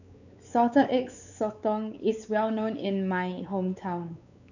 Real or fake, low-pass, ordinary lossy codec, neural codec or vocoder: fake; 7.2 kHz; none; codec, 16 kHz, 4 kbps, X-Codec, WavLM features, trained on Multilingual LibriSpeech